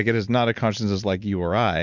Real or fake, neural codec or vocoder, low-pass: real; none; 7.2 kHz